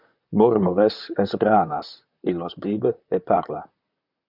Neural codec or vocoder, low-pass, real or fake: vocoder, 44.1 kHz, 128 mel bands, Pupu-Vocoder; 5.4 kHz; fake